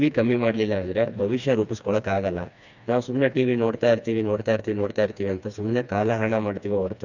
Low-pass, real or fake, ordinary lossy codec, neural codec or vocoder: 7.2 kHz; fake; none; codec, 16 kHz, 2 kbps, FreqCodec, smaller model